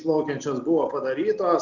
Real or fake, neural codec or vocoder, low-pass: real; none; 7.2 kHz